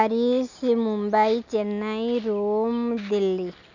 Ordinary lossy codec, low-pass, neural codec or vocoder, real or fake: none; 7.2 kHz; none; real